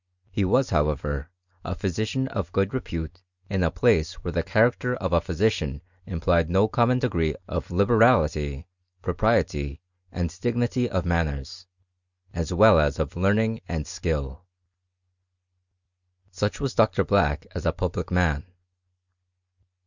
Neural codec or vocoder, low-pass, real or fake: none; 7.2 kHz; real